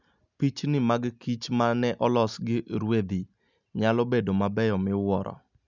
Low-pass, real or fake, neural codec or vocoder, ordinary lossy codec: 7.2 kHz; real; none; none